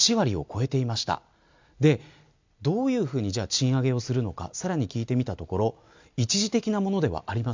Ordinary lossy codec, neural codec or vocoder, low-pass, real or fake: MP3, 64 kbps; none; 7.2 kHz; real